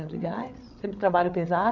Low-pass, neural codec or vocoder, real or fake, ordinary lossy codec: 7.2 kHz; codec, 16 kHz, 8 kbps, FreqCodec, larger model; fake; none